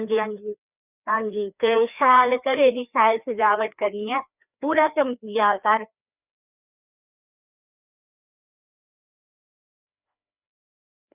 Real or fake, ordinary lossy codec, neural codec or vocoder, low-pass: fake; none; codec, 16 kHz, 2 kbps, FreqCodec, larger model; 3.6 kHz